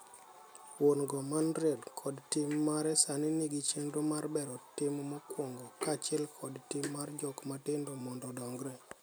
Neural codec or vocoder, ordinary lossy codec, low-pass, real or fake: none; none; none; real